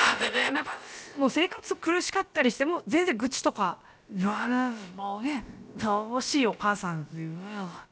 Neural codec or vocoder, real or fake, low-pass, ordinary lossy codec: codec, 16 kHz, about 1 kbps, DyCAST, with the encoder's durations; fake; none; none